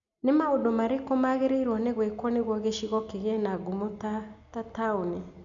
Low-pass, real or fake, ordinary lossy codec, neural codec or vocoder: 7.2 kHz; real; none; none